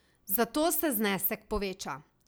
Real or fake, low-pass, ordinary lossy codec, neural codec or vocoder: real; none; none; none